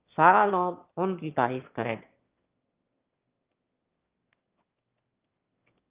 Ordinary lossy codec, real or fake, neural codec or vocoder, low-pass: Opus, 32 kbps; fake; autoencoder, 22.05 kHz, a latent of 192 numbers a frame, VITS, trained on one speaker; 3.6 kHz